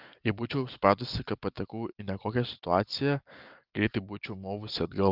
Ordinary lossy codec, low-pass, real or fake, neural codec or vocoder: Opus, 32 kbps; 5.4 kHz; real; none